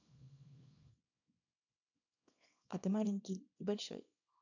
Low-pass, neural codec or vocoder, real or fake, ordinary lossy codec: 7.2 kHz; codec, 24 kHz, 0.9 kbps, WavTokenizer, small release; fake; none